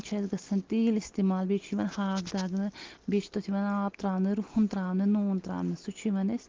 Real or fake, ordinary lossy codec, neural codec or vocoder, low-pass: real; Opus, 16 kbps; none; 7.2 kHz